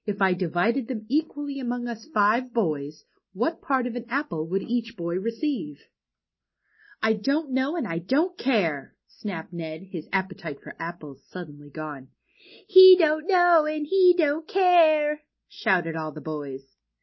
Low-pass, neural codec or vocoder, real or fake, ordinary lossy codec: 7.2 kHz; none; real; MP3, 24 kbps